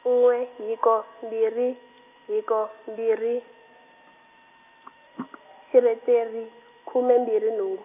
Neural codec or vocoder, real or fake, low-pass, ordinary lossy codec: none; real; 3.6 kHz; none